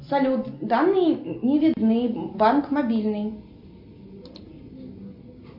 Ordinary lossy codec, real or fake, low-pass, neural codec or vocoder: AAC, 48 kbps; real; 5.4 kHz; none